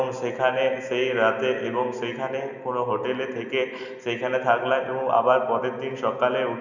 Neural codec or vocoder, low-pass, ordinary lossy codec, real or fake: none; 7.2 kHz; none; real